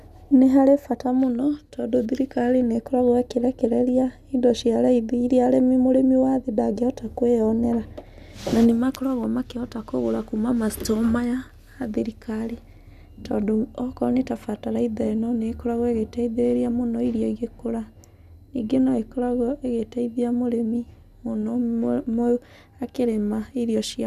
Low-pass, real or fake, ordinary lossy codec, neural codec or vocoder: 14.4 kHz; real; none; none